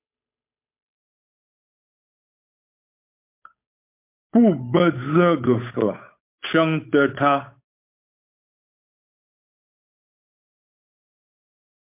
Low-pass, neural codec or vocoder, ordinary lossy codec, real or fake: 3.6 kHz; codec, 16 kHz, 8 kbps, FunCodec, trained on Chinese and English, 25 frames a second; MP3, 32 kbps; fake